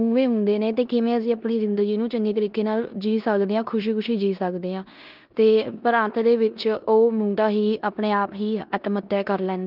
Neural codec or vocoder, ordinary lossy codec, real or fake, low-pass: codec, 16 kHz in and 24 kHz out, 0.9 kbps, LongCat-Audio-Codec, four codebook decoder; Opus, 24 kbps; fake; 5.4 kHz